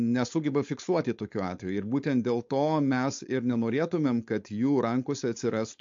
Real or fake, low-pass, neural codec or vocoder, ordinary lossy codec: fake; 7.2 kHz; codec, 16 kHz, 4.8 kbps, FACodec; MP3, 48 kbps